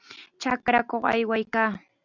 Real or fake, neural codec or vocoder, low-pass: real; none; 7.2 kHz